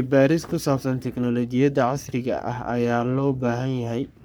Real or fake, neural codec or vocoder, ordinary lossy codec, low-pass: fake; codec, 44.1 kHz, 3.4 kbps, Pupu-Codec; none; none